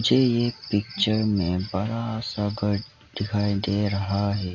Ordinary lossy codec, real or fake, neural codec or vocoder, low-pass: none; real; none; 7.2 kHz